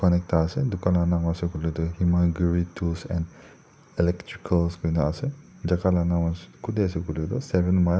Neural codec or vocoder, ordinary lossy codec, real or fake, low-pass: none; none; real; none